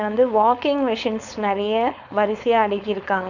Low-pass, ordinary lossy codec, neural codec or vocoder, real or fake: 7.2 kHz; none; codec, 16 kHz, 4.8 kbps, FACodec; fake